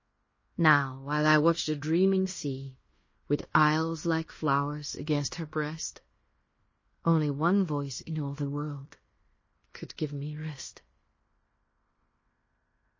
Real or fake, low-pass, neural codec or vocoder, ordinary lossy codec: fake; 7.2 kHz; codec, 16 kHz in and 24 kHz out, 0.9 kbps, LongCat-Audio-Codec, fine tuned four codebook decoder; MP3, 32 kbps